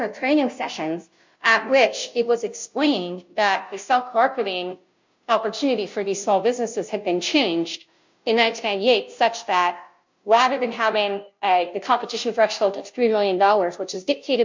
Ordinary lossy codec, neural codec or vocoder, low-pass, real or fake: MP3, 48 kbps; codec, 16 kHz, 0.5 kbps, FunCodec, trained on Chinese and English, 25 frames a second; 7.2 kHz; fake